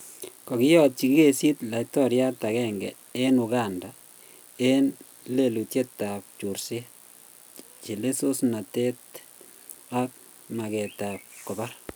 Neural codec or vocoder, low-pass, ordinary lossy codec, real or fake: none; none; none; real